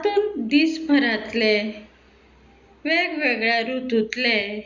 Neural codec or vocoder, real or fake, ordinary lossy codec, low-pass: none; real; Opus, 64 kbps; 7.2 kHz